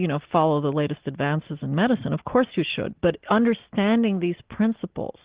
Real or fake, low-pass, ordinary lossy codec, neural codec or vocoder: real; 3.6 kHz; Opus, 16 kbps; none